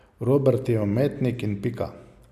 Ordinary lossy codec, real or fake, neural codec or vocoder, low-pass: none; real; none; 14.4 kHz